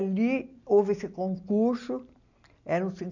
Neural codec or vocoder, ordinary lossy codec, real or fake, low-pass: none; none; real; 7.2 kHz